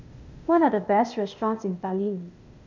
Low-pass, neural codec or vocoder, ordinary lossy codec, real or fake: 7.2 kHz; codec, 16 kHz, 0.8 kbps, ZipCodec; none; fake